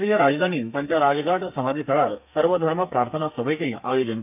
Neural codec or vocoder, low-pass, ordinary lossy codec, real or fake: codec, 32 kHz, 1.9 kbps, SNAC; 3.6 kHz; none; fake